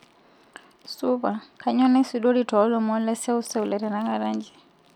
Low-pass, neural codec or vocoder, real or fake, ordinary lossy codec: 19.8 kHz; none; real; none